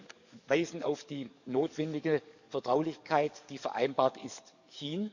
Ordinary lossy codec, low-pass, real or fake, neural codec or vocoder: none; 7.2 kHz; fake; codec, 44.1 kHz, 7.8 kbps, DAC